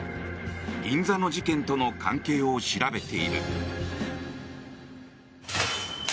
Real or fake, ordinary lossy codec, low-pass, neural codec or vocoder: real; none; none; none